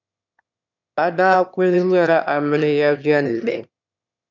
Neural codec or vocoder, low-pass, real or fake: autoencoder, 22.05 kHz, a latent of 192 numbers a frame, VITS, trained on one speaker; 7.2 kHz; fake